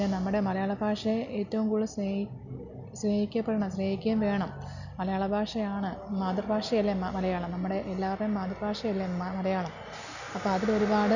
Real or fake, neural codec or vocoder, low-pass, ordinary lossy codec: real; none; 7.2 kHz; none